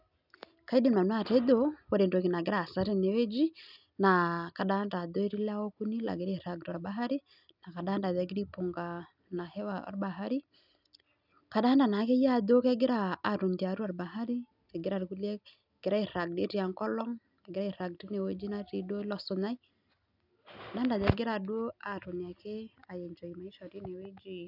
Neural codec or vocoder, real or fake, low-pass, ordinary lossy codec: none; real; 5.4 kHz; none